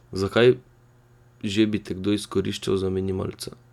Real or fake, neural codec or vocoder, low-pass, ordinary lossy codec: real; none; 19.8 kHz; none